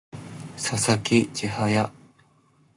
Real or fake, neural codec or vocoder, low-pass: fake; codec, 44.1 kHz, 7.8 kbps, Pupu-Codec; 10.8 kHz